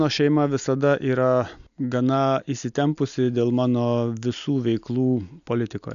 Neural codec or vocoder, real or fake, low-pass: none; real; 7.2 kHz